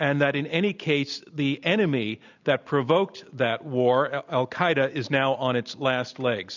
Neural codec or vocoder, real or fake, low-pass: none; real; 7.2 kHz